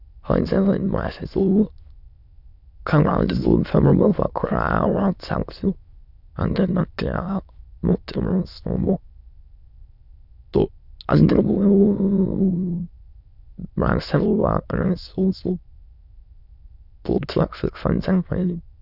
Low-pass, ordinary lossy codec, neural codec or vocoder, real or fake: 5.4 kHz; none; autoencoder, 22.05 kHz, a latent of 192 numbers a frame, VITS, trained on many speakers; fake